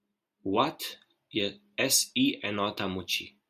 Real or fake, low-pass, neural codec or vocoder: real; 14.4 kHz; none